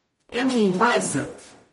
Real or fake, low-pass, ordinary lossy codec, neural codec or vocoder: fake; 19.8 kHz; MP3, 48 kbps; codec, 44.1 kHz, 0.9 kbps, DAC